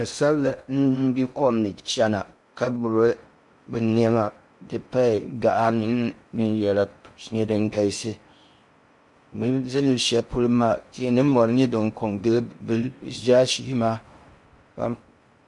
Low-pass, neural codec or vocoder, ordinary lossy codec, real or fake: 10.8 kHz; codec, 16 kHz in and 24 kHz out, 0.6 kbps, FocalCodec, streaming, 2048 codes; MP3, 64 kbps; fake